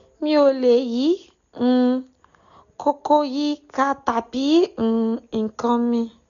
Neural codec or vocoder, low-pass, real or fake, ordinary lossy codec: none; 7.2 kHz; real; none